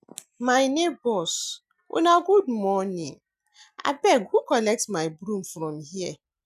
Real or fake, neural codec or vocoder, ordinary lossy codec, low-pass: real; none; none; 14.4 kHz